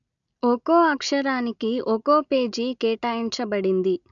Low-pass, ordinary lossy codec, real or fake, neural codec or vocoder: 7.2 kHz; none; real; none